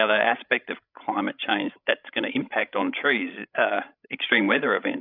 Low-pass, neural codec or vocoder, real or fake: 5.4 kHz; codec, 16 kHz, 16 kbps, FreqCodec, larger model; fake